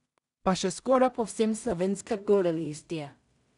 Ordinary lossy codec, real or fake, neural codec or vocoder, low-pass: none; fake; codec, 16 kHz in and 24 kHz out, 0.4 kbps, LongCat-Audio-Codec, two codebook decoder; 10.8 kHz